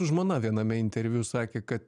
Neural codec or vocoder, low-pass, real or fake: none; 10.8 kHz; real